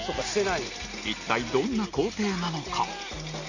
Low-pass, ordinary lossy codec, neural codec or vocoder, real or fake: 7.2 kHz; none; none; real